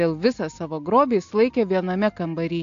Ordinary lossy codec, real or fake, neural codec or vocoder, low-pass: AAC, 48 kbps; real; none; 7.2 kHz